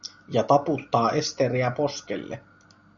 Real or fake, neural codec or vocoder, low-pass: real; none; 7.2 kHz